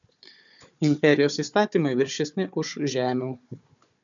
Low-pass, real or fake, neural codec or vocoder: 7.2 kHz; fake; codec, 16 kHz, 4 kbps, FunCodec, trained on Chinese and English, 50 frames a second